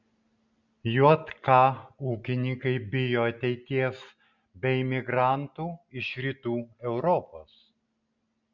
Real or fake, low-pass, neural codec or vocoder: real; 7.2 kHz; none